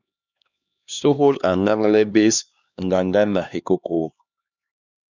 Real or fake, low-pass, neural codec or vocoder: fake; 7.2 kHz; codec, 16 kHz, 2 kbps, X-Codec, HuBERT features, trained on LibriSpeech